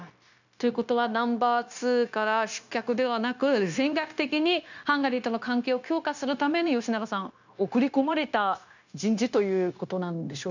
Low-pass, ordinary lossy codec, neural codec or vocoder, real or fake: 7.2 kHz; none; codec, 16 kHz, 0.9 kbps, LongCat-Audio-Codec; fake